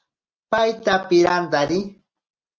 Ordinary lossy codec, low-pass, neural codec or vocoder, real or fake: Opus, 24 kbps; 7.2 kHz; none; real